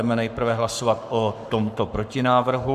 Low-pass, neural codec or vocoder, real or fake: 14.4 kHz; codec, 44.1 kHz, 7.8 kbps, Pupu-Codec; fake